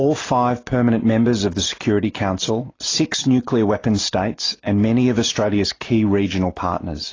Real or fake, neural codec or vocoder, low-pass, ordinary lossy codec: real; none; 7.2 kHz; AAC, 32 kbps